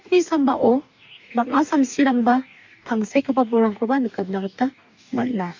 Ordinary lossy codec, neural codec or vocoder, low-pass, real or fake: MP3, 64 kbps; codec, 44.1 kHz, 2.6 kbps, DAC; 7.2 kHz; fake